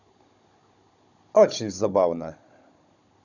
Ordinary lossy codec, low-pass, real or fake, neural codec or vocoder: none; 7.2 kHz; fake; codec, 16 kHz, 16 kbps, FunCodec, trained on Chinese and English, 50 frames a second